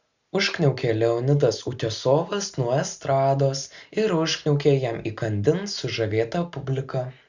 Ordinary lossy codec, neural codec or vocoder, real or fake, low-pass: Opus, 64 kbps; none; real; 7.2 kHz